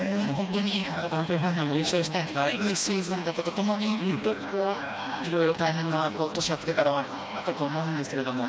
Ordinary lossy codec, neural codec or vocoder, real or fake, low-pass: none; codec, 16 kHz, 1 kbps, FreqCodec, smaller model; fake; none